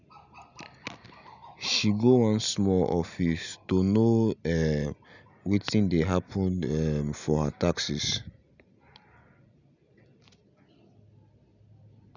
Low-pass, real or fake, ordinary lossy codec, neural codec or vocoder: 7.2 kHz; real; none; none